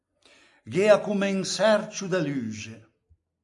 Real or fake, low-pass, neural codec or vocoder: real; 10.8 kHz; none